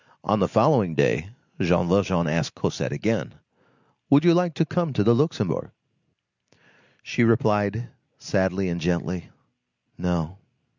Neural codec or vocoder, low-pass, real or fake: none; 7.2 kHz; real